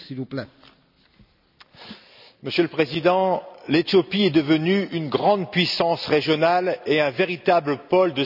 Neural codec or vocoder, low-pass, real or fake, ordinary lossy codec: none; 5.4 kHz; real; none